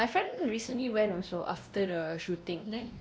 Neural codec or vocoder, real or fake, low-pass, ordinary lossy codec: codec, 16 kHz, 1 kbps, X-Codec, WavLM features, trained on Multilingual LibriSpeech; fake; none; none